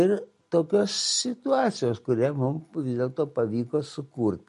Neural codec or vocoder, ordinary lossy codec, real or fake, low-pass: none; MP3, 48 kbps; real; 14.4 kHz